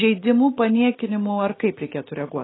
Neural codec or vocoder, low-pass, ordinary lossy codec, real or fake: none; 7.2 kHz; AAC, 16 kbps; real